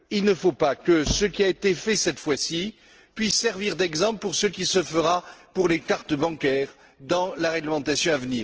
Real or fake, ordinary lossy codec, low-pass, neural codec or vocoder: real; Opus, 16 kbps; 7.2 kHz; none